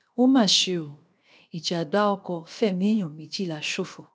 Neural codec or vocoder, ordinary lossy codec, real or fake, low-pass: codec, 16 kHz, 0.7 kbps, FocalCodec; none; fake; none